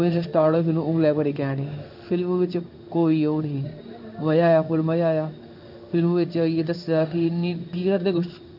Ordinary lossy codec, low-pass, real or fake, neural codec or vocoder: none; 5.4 kHz; fake; codec, 16 kHz in and 24 kHz out, 1 kbps, XY-Tokenizer